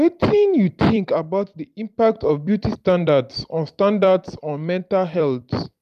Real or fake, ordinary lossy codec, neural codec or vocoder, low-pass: fake; none; vocoder, 44.1 kHz, 128 mel bands every 256 samples, BigVGAN v2; 14.4 kHz